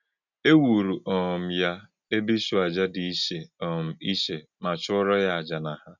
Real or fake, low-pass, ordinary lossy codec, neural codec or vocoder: real; 7.2 kHz; none; none